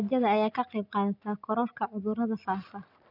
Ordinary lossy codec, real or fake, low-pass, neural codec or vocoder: none; real; 5.4 kHz; none